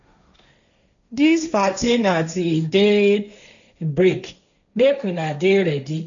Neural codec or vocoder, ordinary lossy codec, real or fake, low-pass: codec, 16 kHz, 1.1 kbps, Voila-Tokenizer; MP3, 96 kbps; fake; 7.2 kHz